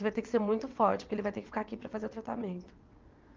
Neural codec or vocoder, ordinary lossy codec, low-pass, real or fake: vocoder, 22.05 kHz, 80 mel bands, WaveNeXt; Opus, 24 kbps; 7.2 kHz; fake